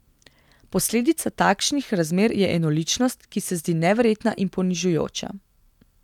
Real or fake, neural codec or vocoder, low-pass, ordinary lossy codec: real; none; 19.8 kHz; none